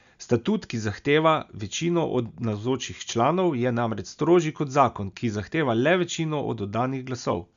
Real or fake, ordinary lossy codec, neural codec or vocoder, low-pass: real; none; none; 7.2 kHz